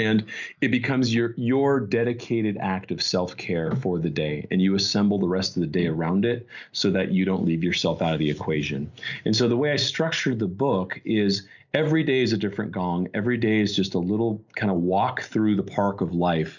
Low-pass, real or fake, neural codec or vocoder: 7.2 kHz; real; none